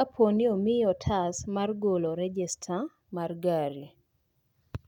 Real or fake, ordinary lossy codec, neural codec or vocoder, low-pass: real; none; none; 19.8 kHz